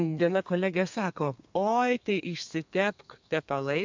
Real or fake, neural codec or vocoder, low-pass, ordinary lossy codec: fake; codec, 32 kHz, 1.9 kbps, SNAC; 7.2 kHz; MP3, 64 kbps